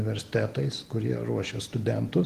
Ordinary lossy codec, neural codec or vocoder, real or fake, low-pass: Opus, 16 kbps; none; real; 14.4 kHz